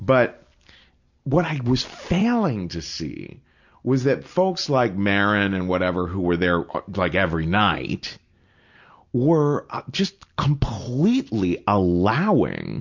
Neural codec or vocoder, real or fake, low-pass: none; real; 7.2 kHz